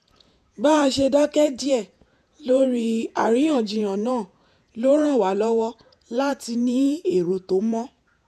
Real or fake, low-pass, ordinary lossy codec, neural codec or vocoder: fake; 14.4 kHz; none; vocoder, 48 kHz, 128 mel bands, Vocos